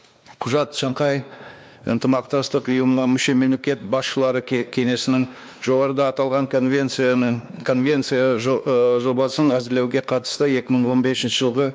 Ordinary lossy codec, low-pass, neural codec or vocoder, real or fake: none; none; codec, 16 kHz, 2 kbps, X-Codec, WavLM features, trained on Multilingual LibriSpeech; fake